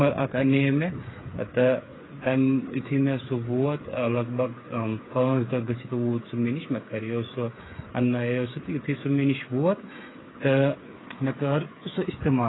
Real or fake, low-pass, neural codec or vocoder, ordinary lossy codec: fake; 7.2 kHz; codec, 16 kHz, 8 kbps, FreqCodec, smaller model; AAC, 16 kbps